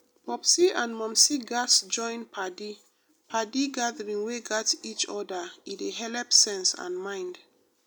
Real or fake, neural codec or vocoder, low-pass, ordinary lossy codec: real; none; none; none